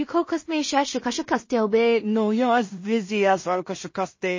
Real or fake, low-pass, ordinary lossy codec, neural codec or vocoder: fake; 7.2 kHz; MP3, 32 kbps; codec, 16 kHz in and 24 kHz out, 0.4 kbps, LongCat-Audio-Codec, two codebook decoder